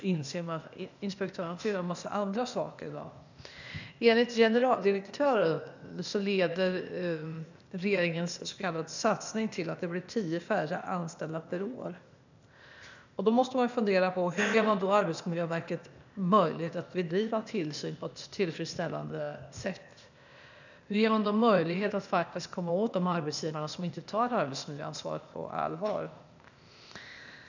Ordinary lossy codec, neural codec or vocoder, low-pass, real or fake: none; codec, 16 kHz, 0.8 kbps, ZipCodec; 7.2 kHz; fake